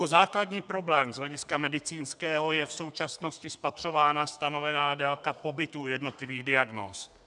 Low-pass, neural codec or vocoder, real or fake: 10.8 kHz; codec, 32 kHz, 1.9 kbps, SNAC; fake